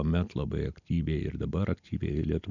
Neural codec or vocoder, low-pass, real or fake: none; 7.2 kHz; real